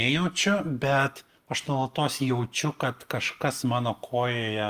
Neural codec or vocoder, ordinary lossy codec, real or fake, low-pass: none; Opus, 32 kbps; real; 14.4 kHz